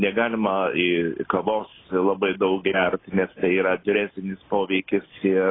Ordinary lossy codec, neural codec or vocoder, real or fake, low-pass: AAC, 16 kbps; none; real; 7.2 kHz